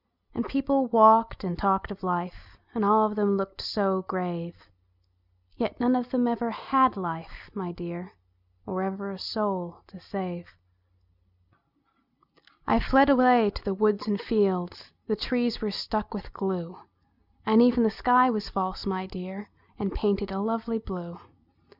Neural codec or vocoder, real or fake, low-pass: none; real; 5.4 kHz